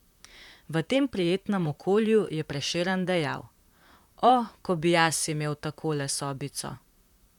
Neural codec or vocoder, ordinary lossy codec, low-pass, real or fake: vocoder, 44.1 kHz, 128 mel bands, Pupu-Vocoder; none; 19.8 kHz; fake